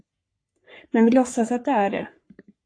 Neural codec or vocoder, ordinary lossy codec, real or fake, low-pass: codec, 44.1 kHz, 3.4 kbps, Pupu-Codec; AAC, 48 kbps; fake; 9.9 kHz